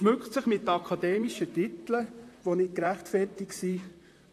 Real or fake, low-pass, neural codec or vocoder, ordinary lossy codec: fake; 14.4 kHz; vocoder, 44.1 kHz, 128 mel bands, Pupu-Vocoder; AAC, 64 kbps